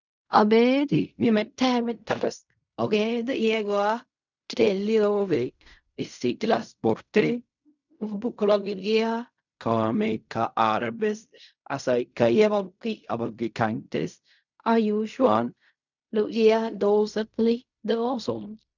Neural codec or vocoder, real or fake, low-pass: codec, 16 kHz in and 24 kHz out, 0.4 kbps, LongCat-Audio-Codec, fine tuned four codebook decoder; fake; 7.2 kHz